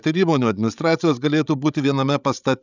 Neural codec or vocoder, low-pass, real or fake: codec, 16 kHz, 16 kbps, FreqCodec, larger model; 7.2 kHz; fake